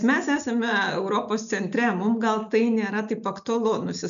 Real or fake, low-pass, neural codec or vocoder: real; 7.2 kHz; none